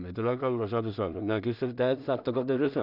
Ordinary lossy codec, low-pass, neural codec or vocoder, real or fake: none; 5.4 kHz; codec, 16 kHz in and 24 kHz out, 0.4 kbps, LongCat-Audio-Codec, two codebook decoder; fake